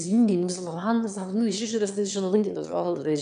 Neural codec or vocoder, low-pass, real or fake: autoencoder, 22.05 kHz, a latent of 192 numbers a frame, VITS, trained on one speaker; 9.9 kHz; fake